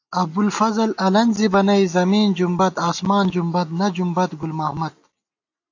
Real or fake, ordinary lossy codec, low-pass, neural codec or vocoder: real; AAC, 48 kbps; 7.2 kHz; none